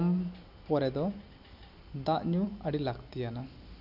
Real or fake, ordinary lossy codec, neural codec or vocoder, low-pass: real; none; none; 5.4 kHz